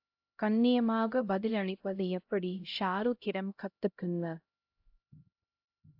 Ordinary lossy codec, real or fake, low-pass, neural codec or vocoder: AAC, 48 kbps; fake; 5.4 kHz; codec, 16 kHz, 0.5 kbps, X-Codec, HuBERT features, trained on LibriSpeech